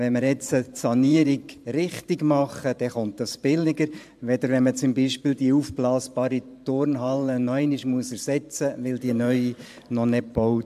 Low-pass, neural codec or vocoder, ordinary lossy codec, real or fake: 14.4 kHz; vocoder, 44.1 kHz, 128 mel bands every 512 samples, BigVGAN v2; AAC, 96 kbps; fake